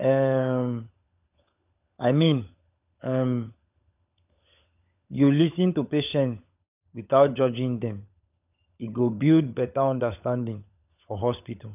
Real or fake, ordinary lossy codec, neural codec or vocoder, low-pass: fake; none; codec, 16 kHz, 16 kbps, FunCodec, trained on LibriTTS, 50 frames a second; 3.6 kHz